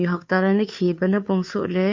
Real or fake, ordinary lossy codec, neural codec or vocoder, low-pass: fake; MP3, 48 kbps; codec, 16 kHz, 2 kbps, FunCodec, trained on Chinese and English, 25 frames a second; 7.2 kHz